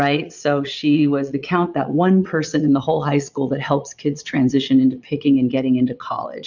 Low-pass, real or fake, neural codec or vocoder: 7.2 kHz; fake; vocoder, 22.05 kHz, 80 mel bands, Vocos